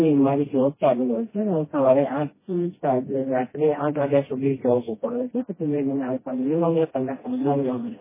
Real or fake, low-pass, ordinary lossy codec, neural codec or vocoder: fake; 3.6 kHz; MP3, 16 kbps; codec, 16 kHz, 1 kbps, FreqCodec, smaller model